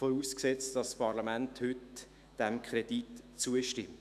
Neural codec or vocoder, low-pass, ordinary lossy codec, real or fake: autoencoder, 48 kHz, 128 numbers a frame, DAC-VAE, trained on Japanese speech; 14.4 kHz; none; fake